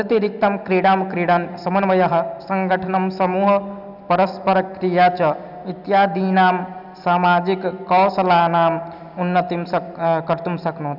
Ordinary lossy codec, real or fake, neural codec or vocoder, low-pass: none; real; none; 5.4 kHz